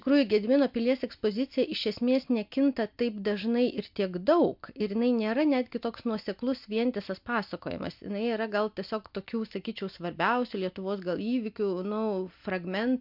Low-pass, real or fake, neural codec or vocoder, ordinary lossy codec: 5.4 kHz; real; none; MP3, 48 kbps